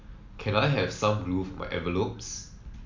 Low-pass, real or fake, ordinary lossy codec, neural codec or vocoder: 7.2 kHz; real; MP3, 64 kbps; none